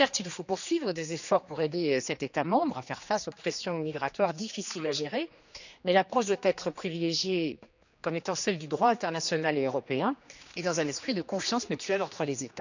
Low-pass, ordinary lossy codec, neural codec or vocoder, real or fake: 7.2 kHz; none; codec, 16 kHz, 2 kbps, X-Codec, HuBERT features, trained on general audio; fake